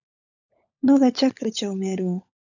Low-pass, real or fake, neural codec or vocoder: 7.2 kHz; fake; codec, 16 kHz, 16 kbps, FunCodec, trained on LibriTTS, 50 frames a second